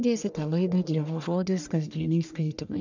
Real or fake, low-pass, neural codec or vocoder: fake; 7.2 kHz; codec, 44.1 kHz, 1.7 kbps, Pupu-Codec